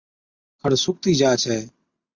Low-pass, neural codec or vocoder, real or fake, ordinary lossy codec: 7.2 kHz; none; real; Opus, 64 kbps